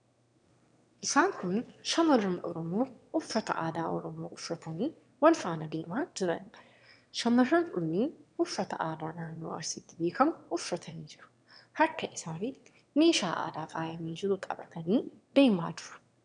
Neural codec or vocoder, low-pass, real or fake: autoencoder, 22.05 kHz, a latent of 192 numbers a frame, VITS, trained on one speaker; 9.9 kHz; fake